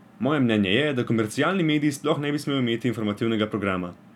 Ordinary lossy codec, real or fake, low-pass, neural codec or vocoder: none; real; 19.8 kHz; none